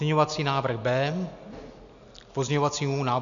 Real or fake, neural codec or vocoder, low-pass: real; none; 7.2 kHz